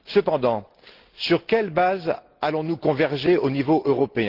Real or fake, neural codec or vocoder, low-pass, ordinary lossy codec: real; none; 5.4 kHz; Opus, 16 kbps